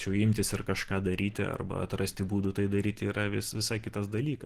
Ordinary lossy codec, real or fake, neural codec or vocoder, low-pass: Opus, 16 kbps; real; none; 14.4 kHz